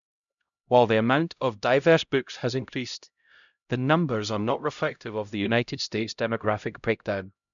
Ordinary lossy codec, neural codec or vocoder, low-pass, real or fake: MP3, 96 kbps; codec, 16 kHz, 0.5 kbps, X-Codec, HuBERT features, trained on LibriSpeech; 7.2 kHz; fake